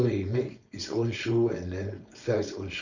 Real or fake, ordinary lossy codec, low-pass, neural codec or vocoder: fake; Opus, 64 kbps; 7.2 kHz; codec, 16 kHz, 4.8 kbps, FACodec